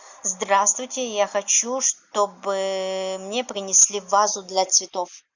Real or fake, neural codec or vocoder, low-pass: real; none; 7.2 kHz